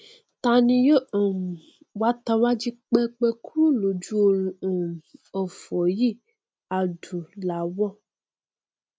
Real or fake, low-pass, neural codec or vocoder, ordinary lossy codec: real; none; none; none